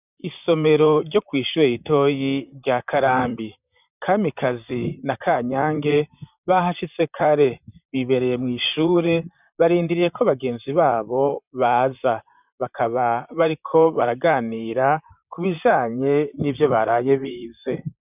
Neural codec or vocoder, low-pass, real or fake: vocoder, 44.1 kHz, 128 mel bands, Pupu-Vocoder; 3.6 kHz; fake